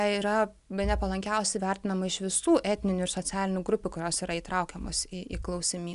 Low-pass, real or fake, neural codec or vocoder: 10.8 kHz; real; none